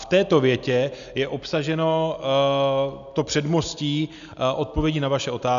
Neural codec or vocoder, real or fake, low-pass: none; real; 7.2 kHz